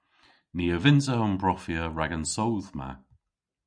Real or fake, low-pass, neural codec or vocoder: real; 9.9 kHz; none